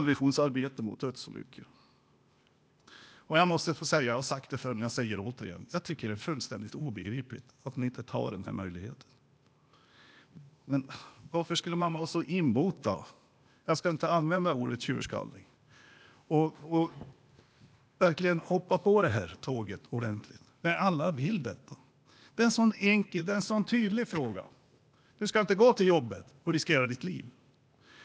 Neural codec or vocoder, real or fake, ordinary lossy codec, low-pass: codec, 16 kHz, 0.8 kbps, ZipCodec; fake; none; none